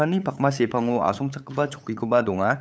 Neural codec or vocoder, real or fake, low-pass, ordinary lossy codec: codec, 16 kHz, 8 kbps, FunCodec, trained on LibriTTS, 25 frames a second; fake; none; none